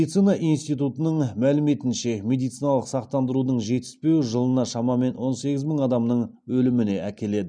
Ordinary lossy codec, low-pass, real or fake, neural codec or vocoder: none; 9.9 kHz; real; none